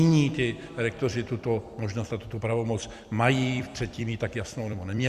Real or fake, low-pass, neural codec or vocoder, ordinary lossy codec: fake; 14.4 kHz; vocoder, 44.1 kHz, 128 mel bands every 256 samples, BigVGAN v2; Opus, 64 kbps